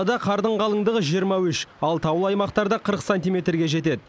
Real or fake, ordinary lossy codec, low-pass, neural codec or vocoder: real; none; none; none